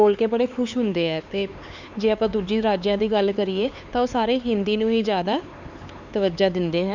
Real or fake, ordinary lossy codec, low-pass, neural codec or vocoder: fake; Opus, 64 kbps; 7.2 kHz; codec, 16 kHz, 4 kbps, X-Codec, HuBERT features, trained on LibriSpeech